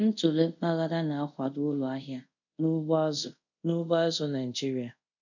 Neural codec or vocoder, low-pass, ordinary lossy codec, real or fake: codec, 24 kHz, 0.5 kbps, DualCodec; 7.2 kHz; none; fake